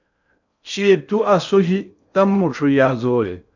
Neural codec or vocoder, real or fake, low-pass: codec, 16 kHz in and 24 kHz out, 0.6 kbps, FocalCodec, streaming, 4096 codes; fake; 7.2 kHz